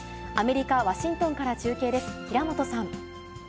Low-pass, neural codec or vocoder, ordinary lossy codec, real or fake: none; none; none; real